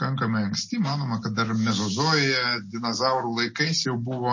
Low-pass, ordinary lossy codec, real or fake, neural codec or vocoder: 7.2 kHz; MP3, 32 kbps; real; none